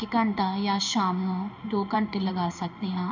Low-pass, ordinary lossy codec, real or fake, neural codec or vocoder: 7.2 kHz; none; fake; codec, 16 kHz in and 24 kHz out, 1 kbps, XY-Tokenizer